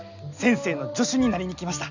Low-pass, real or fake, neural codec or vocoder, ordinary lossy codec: 7.2 kHz; real; none; none